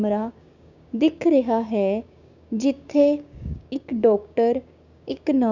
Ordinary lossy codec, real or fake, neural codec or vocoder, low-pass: none; fake; codec, 16 kHz, 6 kbps, DAC; 7.2 kHz